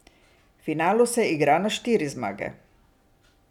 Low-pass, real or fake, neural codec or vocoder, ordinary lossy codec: 19.8 kHz; real; none; none